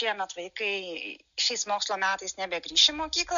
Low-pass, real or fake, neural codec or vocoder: 7.2 kHz; real; none